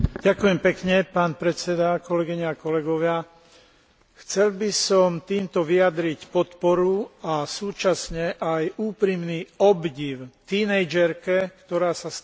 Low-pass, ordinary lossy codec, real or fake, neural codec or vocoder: none; none; real; none